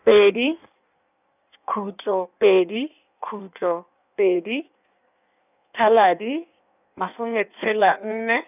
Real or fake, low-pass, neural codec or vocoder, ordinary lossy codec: fake; 3.6 kHz; codec, 16 kHz in and 24 kHz out, 1.1 kbps, FireRedTTS-2 codec; none